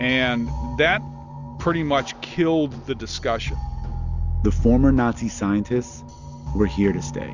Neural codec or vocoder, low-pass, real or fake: none; 7.2 kHz; real